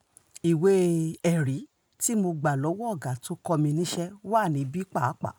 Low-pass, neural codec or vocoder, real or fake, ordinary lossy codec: none; none; real; none